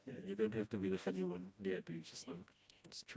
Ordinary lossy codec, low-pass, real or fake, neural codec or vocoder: none; none; fake; codec, 16 kHz, 1 kbps, FreqCodec, smaller model